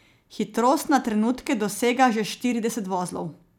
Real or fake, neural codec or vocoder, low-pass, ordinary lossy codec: real; none; 19.8 kHz; none